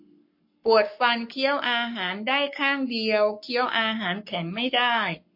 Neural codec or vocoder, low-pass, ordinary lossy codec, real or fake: codec, 44.1 kHz, 7.8 kbps, Pupu-Codec; 5.4 kHz; MP3, 32 kbps; fake